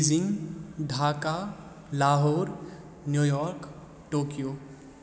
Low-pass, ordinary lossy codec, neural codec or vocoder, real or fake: none; none; none; real